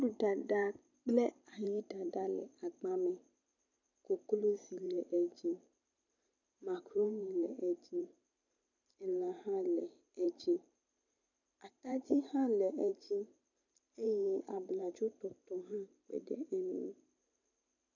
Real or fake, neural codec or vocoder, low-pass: fake; vocoder, 44.1 kHz, 128 mel bands every 512 samples, BigVGAN v2; 7.2 kHz